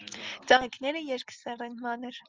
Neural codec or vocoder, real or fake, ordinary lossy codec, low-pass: none; real; Opus, 24 kbps; 7.2 kHz